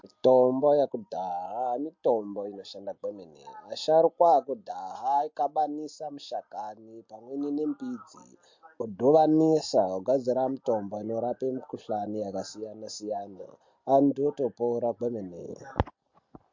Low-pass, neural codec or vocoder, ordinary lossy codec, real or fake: 7.2 kHz; none; MP3, 48 kbps; real